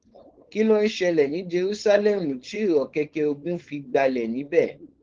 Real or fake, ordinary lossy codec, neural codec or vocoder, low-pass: fake; Opus, 16 kbps; codec, 16 kHz, 4.8 kbps, FACodec; 7.2 kHz